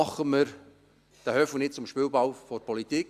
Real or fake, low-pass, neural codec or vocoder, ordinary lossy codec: real; 14.4 kHz; none; Opus, 64 kbps